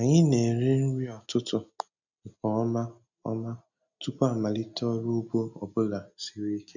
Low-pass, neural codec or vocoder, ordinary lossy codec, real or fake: 7.2 kHz; none; none; real